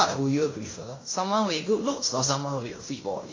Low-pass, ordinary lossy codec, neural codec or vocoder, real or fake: 7.2 kHz; AAC, 32 kbps; codec, 16 kHz in and 24 kHz out, 0.9 kbps, LongCat-Audio-Codec, fine tuned four codebook decoder; fake